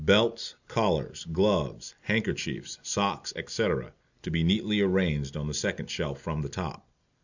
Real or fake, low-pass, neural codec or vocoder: real; 7.2 kHz; none